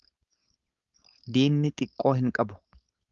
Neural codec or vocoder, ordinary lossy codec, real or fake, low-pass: codec, 16 kHz, 4.8 kbps, FACodec; Opus, 32 kbps; fake; 7.2 kHz